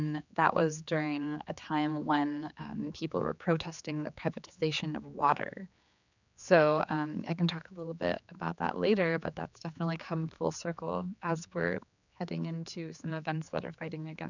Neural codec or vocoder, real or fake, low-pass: codec, 16 kHz, 4 kbps, X-Codec, HuBERT features, trained on general audio; fake; 7.2 kHz